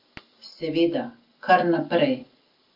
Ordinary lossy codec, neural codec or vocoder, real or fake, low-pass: Opus, 24 kbps; none; real; 5.4 kHz